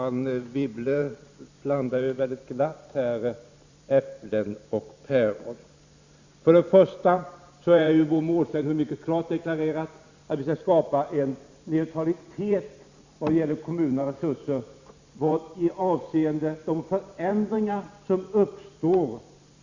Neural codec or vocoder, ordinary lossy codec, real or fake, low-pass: vocoder, 44.1 kHz, 128 mel bands every 512 samples, BigVGAN v2; Opus, 64 kbps; fake; 7.2 kHz